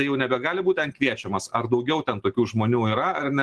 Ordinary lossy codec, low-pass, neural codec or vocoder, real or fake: Opus, 16 kbps; 10.8 kHz; none; real